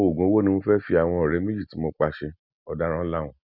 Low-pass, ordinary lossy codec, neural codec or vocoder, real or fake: 5.4 kHz; none; none; real